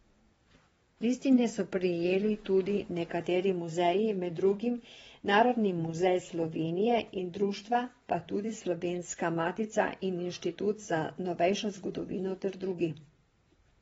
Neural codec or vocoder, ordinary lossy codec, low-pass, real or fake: vocoder, 22.05 kHz, 80 mel bands, WaveNeXt; AAC, 24 kbps; 9.9 kHz; fake